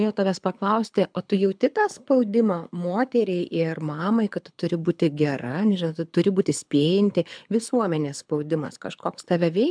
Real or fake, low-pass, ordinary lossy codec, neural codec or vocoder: fake; 9.9 kHz; MP3, 96 kbps; codec, 24 kHz, 6 kbps, HILCodec